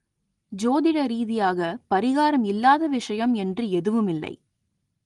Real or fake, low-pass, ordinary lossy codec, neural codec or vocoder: real; 10.8 kHz; Opus, 24 kbps; none